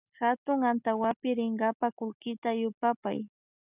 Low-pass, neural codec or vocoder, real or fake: 3.6 kHz; none; real